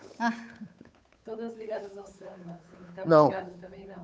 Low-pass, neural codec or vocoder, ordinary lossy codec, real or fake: none; codec, 16 kHz, 8 kbps, FunCodec, trained on Chinese and English, 25 frames a second; none; fake